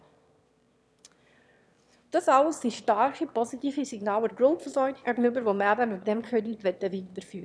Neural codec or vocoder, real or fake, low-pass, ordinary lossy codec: autoencoder, 22.05 kHz, a latent of 192 numbers a frame, VITS, trained on one speaker; fake; none; none